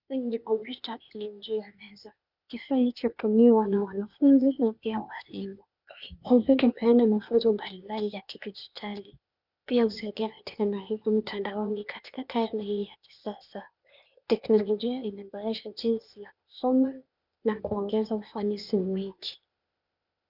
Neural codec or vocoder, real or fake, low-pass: codec, 16 kHz, 0.8 kbps, ZipCodec; fake; 5.4 kHz